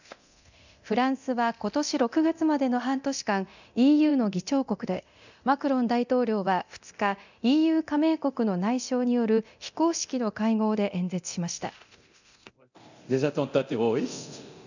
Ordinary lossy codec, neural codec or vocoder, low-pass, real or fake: none; codec, 24 kHz, 0.9 kbps, DualCodec; 7.2 kHz; fake